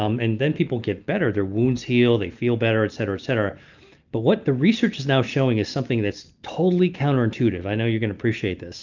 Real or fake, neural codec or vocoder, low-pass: real; none; 7.2 kHz